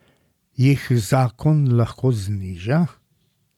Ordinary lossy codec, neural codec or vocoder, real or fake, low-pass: none; none; real; 19.8 kHz